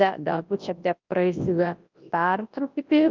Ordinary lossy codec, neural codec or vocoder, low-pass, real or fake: Opus, 24 kbps; codec, 24 kHz, 0.9 kbps, WavTokenizer, large speech release; 7.2 kHz; fake